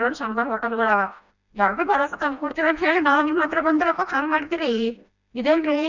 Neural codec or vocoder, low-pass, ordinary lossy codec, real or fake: codec, 16 kHz, 1 kbps, FreqCodec, smaller model; 7.2 kHz; none; fake